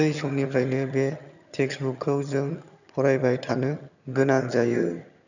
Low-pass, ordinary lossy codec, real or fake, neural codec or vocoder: 7.2 kHz; none; fake; vocoder, 22.05 kHz, 80 mel bands, HiFi-GAN